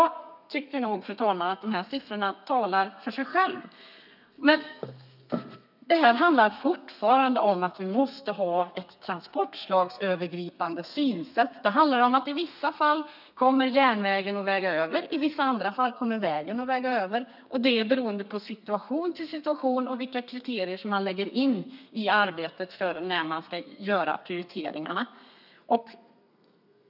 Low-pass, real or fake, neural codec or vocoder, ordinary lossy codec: 5.4 kHz; fake; codec, 32 kHz, 1.9 kbps, SNAC; none